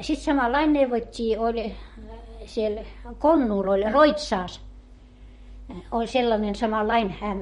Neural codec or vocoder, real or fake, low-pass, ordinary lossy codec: vocoder, 44.1 kHz, 128 mel bands, Pupu-Vocoder; fake; 19.8 kHz; MP3, 48 kbps